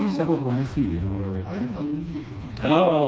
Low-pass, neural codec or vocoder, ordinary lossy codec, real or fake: none; codec, 16 kHz, 2 kbps, FreqCodec, smaller model; none; fake